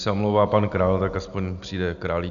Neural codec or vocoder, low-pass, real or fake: none; 7.2 kHz; real